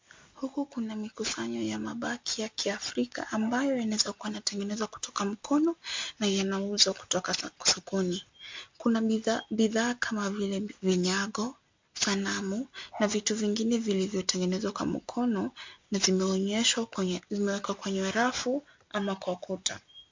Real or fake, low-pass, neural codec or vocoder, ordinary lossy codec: real; 7.2 kHz; none; MP3, 48 kbps